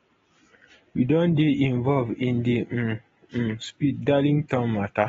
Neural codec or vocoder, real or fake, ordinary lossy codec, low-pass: none; real; AAC, 24 kbps; 7.2 kHz